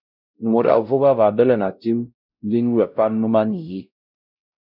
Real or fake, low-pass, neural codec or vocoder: fake; 5.4 kHz; codec, 16 kHz, 0.5 kbps, X-Codec, WavLM features, trained on Multilingual LibriSpeech